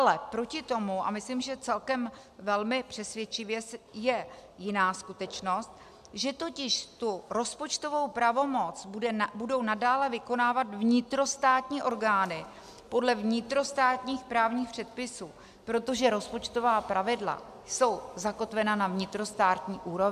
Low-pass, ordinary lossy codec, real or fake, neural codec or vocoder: 14.4 kHz; AAC, 96 kbps; real; none